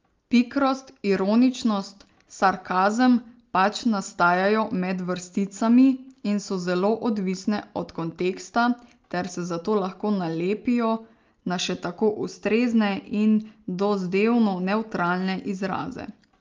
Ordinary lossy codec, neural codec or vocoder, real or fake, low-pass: Opus, 32 kbps; none; real; 7.2 kHz